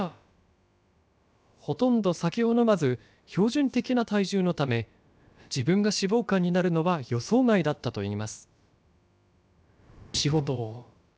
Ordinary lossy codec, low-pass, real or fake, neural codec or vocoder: none; none; fake; codec, 16 kHz, about 1 kbps, DyCAST, with the encoder's durations